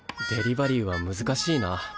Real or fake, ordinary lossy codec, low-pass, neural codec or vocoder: real; none; none; none